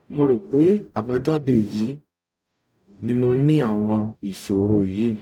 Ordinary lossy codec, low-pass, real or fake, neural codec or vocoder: none; 19.8 kHz; fake; codec, 44.1 kHz, 0.9 kbps, DAC